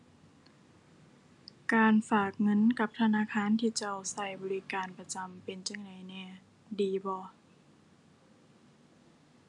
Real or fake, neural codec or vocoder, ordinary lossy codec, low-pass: real; none; none; 10.8 kHz